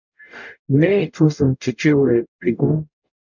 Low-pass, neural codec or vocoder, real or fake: 7.2 kHz; codec, 44.1 kHz, 0.9 kbps, DAC; fake